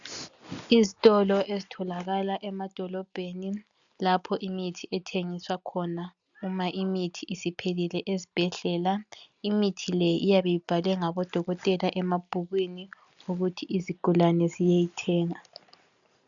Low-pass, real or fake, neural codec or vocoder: 7.2 kHz; real; none